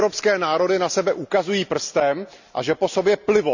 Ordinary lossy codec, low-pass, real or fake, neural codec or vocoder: none; 7.2 kHz; real; none